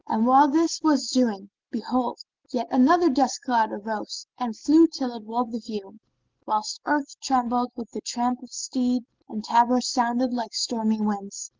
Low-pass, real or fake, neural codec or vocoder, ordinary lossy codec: 7.2 kHz; real; none; Opus, 16 kbps